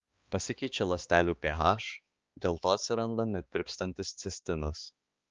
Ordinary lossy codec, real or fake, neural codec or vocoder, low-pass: Opus, 24 kbps; fake; codec, 16 kHz, 2 kbps, X-Codec, HuBERT features, trained on balanced general audio; 7.2 kHz